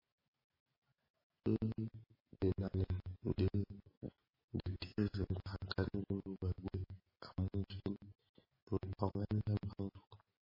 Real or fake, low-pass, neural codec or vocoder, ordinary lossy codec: real; 5.4 kHz; none; MP3, 24 kbps